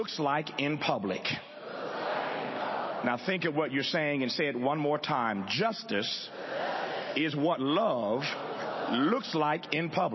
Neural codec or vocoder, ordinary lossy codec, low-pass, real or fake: none; MP3, 24 kbps; 7.2 kHz; real